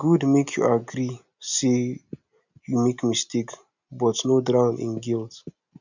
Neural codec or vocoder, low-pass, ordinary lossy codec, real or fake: none; 7.2 kHz; none; real